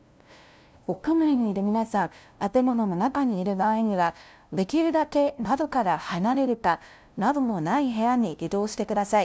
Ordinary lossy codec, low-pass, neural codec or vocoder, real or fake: none; none; codec, 16 kHz, 0.5 kbps, FunCodec, trained on LibriTTS, 25 frames a second; fake